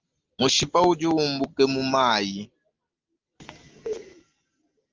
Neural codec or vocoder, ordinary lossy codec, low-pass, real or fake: none; Opus, 24 kbps; 7.2 kHz; real